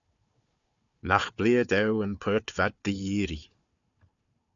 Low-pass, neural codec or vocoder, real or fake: 7.2 kHz; codec, 16 kHz, 4 kbps, FunCodec, trained on Chinese and English, 50 frames a second; fake